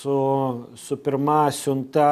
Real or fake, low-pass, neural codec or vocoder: real; 14.4 kHz; none